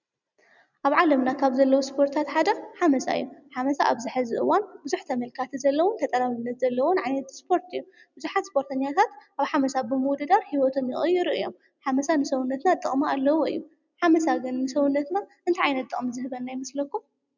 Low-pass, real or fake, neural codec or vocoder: 7.2 kHz; real; none